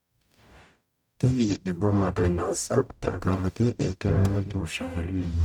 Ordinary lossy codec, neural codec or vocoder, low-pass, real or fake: none; codec, 44.1 kHz, 0.9 kbps, DAC; 19.8 kHz; fake